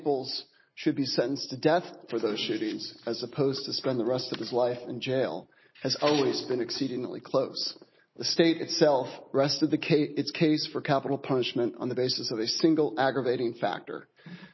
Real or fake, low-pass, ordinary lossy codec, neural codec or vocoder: real; 7.2 kHz; MP3, 24 kbps; none